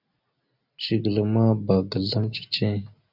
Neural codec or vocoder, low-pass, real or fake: none; 5.4 kHz; real